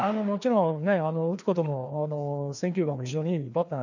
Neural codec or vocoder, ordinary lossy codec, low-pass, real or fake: codec, 16 kHz, 2 kbps, FreqCodec, larger model; none; 7.2 kHz; fake